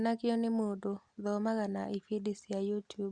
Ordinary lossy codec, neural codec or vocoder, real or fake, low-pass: Opus, 64 kbps; none; real; 9.9 kHz